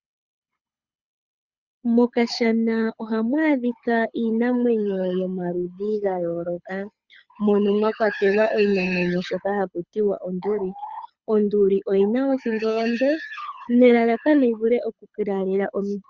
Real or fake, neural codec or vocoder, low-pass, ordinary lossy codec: fake; codec, 24 kHz, 6 kbps, HILCodec; 7.2 kHz; Opus, 64 kbps